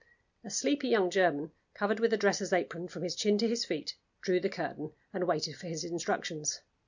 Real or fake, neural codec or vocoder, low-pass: real; none; 7.2 kHz